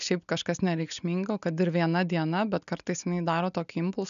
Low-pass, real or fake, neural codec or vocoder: 7.2 kHz; real; none